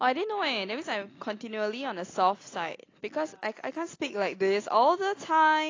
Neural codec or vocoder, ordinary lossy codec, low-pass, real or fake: none; AAC, 32 kbps; 7.2 kHz; real